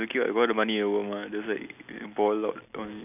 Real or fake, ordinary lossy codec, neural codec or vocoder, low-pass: real; none; none; 3.6 kHz